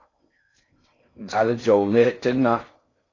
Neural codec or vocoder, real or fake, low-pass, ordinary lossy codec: codec, 16 kHz in and 24 kHz out, 0.6 kbps, FocalCodec, streaming, 4096 codes; fake; 7.2 kHz; AAC, 32 kbps